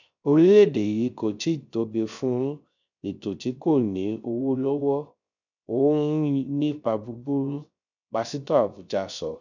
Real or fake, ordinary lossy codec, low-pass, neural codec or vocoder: fake; none; 7.2 kHz; codec, 16 kHz, 0.3 kbps, FocalCodec